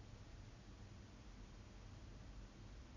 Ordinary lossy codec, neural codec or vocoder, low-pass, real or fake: none; none; 7.2 kHz; real